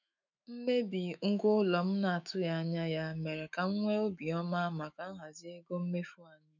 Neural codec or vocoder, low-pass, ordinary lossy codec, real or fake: autoencoder, 48 kHz, 128 numbers a frame, DAC-VAE, trained on Japanese speech; 7.2 kHz; none; fake